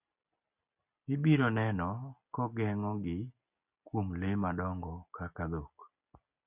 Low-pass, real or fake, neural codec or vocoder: 3.6 kHz; real; none